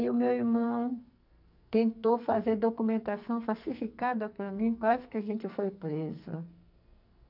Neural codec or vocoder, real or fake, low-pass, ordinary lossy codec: codec, 44.1 kHz, 2.6 kbps, SNAC; fake; 5.4 kHz; none